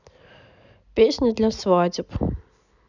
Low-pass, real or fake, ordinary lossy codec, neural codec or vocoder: 7.2 kHz; real; none; none